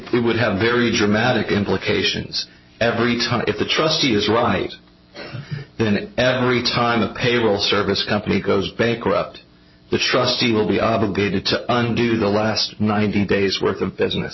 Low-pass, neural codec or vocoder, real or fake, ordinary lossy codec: 7.2 kHz; none; real; MP3, 24 kbps